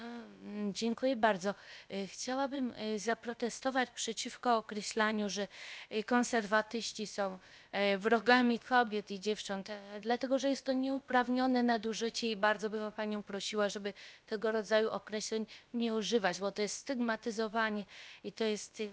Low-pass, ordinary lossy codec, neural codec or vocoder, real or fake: none; none; codec, 16 kHz, about 1 kbps, DyCAST, with the encoder's durations; fake